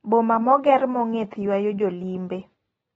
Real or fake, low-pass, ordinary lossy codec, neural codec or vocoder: real; 19.8 kHz; AAC, 24 kbps; none